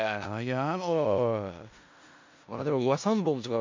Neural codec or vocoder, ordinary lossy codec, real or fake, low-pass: codec, 16 kHz in and 24 kHz out, 0.4 kbps, LongCat-Audio-Codec, four codebook decoder; MP3, 64 kbps; fake; 7.2 kHz